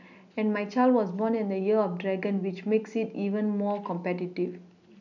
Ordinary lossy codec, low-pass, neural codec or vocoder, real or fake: none; 7.2 kHz; none; real